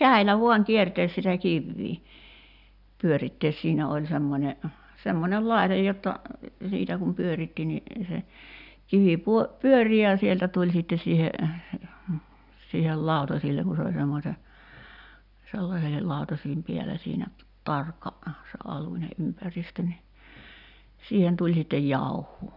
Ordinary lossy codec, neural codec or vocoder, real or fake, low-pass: none; none; real; 5.4 kHz